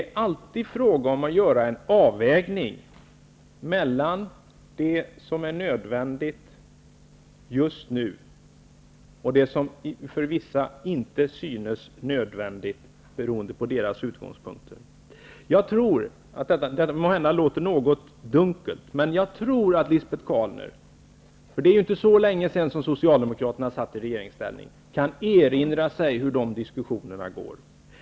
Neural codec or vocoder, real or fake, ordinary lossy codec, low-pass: none; real; none; none